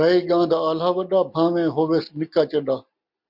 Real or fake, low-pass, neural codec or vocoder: real; 5.4 kHz; none